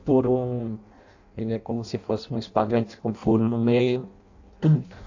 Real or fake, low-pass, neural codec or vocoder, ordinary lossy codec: fake; 7.2 kHz; codec, 16 kHz in and 24 kHz out, 0.6 kbps, FireRedTTS-2 codec; AAC, 48 kbps